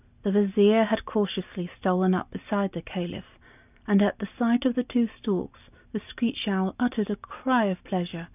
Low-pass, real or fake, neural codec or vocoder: 3.6 kHz; real; none